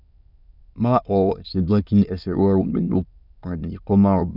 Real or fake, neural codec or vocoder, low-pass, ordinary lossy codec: fake; autoencoder, 22.05 kHz, a latent of 192 numbers a frame, VITS, trained on many speakers; 5.4 kHz; none